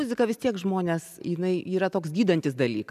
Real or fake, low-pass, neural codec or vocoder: real; 14.4 kHz; none